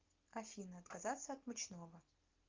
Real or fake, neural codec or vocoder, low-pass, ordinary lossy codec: real; none; 7.2 kHz; Opus, 24 kbps